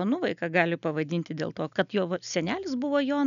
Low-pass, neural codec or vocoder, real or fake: 7.2 kHz; none; real